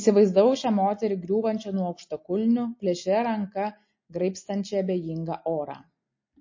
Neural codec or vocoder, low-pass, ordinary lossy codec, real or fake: none; 7.2 kHz; MP3, 32 kbps; real